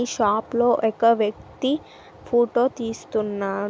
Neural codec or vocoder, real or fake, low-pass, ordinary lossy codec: none; real; none; none